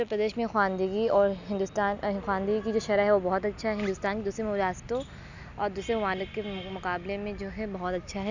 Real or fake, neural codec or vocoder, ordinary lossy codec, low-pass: real; none; none; 7.2 kHz